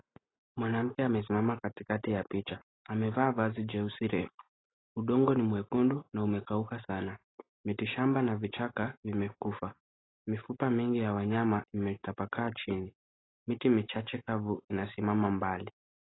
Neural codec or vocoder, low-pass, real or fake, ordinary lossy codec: none; 7.2 kHz; real; AAC, 16 kbps